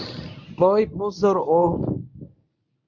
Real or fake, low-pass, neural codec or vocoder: fake; 7.2 kHz; codec, 24 kHz, 0.9 kbps, WavTokenizer, medium speech release version 1